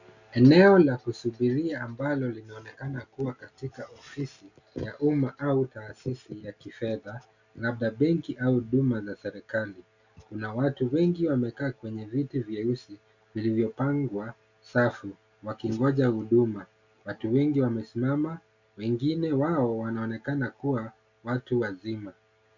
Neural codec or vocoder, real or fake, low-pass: none; real; 7.2 kHz